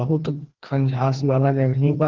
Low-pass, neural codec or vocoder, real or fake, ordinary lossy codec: 7.2 kHz; codec, 16 kHz, 2 kbps, FreqCodec, larger model; fake; Opus, 16 kbps